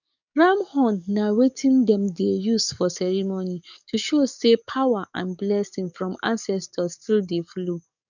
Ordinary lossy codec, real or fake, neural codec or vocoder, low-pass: none; fake; codec, 44.1 kHz, 7.8 kbps, DAC; 7.2 kHz